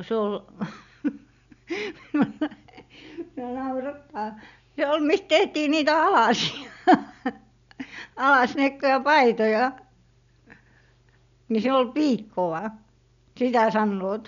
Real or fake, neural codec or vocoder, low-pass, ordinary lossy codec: real; none; 7.2 kHz; none